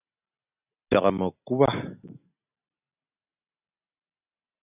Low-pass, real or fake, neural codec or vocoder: 3.6 kHz; real; none